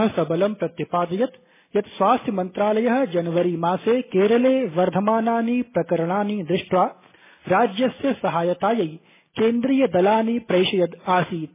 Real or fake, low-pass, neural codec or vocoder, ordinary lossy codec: real; 3.6 kHz; none; MP3, 16 kbps